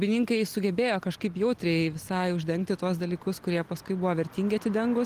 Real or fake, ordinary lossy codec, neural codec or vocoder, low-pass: real; Opus, 24 kbps; none; 14.4 kHz